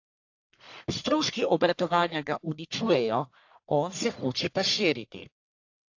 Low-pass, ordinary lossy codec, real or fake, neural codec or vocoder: 7.2 kHz; AAC, 48 kbps; fake; codec, 44.1 kHz, 1.7 kbps, Pupu-Codec